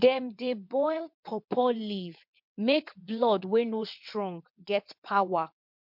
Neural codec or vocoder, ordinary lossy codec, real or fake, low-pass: vocoder, 22.05 kHz, 80 mel bands, WaveNeXt; MP3, 48 kbps; fake; 5.4 kHz